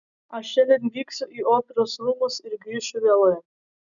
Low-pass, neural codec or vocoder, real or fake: 7.2 kHz; none; real